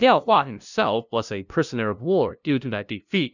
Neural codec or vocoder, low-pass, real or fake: codec, 16 kHz, 0.5 kbps, FunCodec, trained on LibriTTS, 25 frames a second; 7.2 kHz; fake